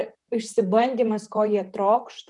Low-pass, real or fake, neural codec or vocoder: 10.8 kHz; fake; vocoder, 44.1 kHz, 128 mel bands, Pupu-Vocoder